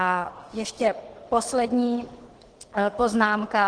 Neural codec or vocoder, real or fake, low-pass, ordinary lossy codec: codec, 24 kHz, 6 kbps, HILCodec; fake; 9.9 kHz; Opus, 16 kbps